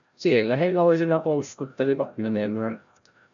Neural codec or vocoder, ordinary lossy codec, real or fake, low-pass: codec, 16 kHz, 0.5 kbps, FreqCodec, larger model; MP3, 96 kbps; fake; 7.2 kHz